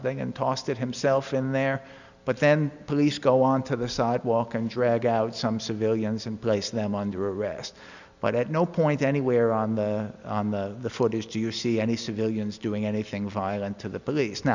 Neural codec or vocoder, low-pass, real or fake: none; 7.2 kHz; real